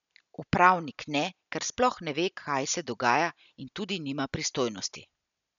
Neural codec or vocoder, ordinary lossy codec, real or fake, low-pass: none; none; real; 7.2 kHz